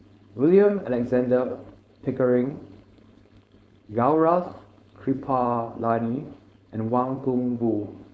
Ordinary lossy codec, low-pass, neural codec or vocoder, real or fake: none; none; codec, 16 kHz, 4.8 kbps, FACodec; fake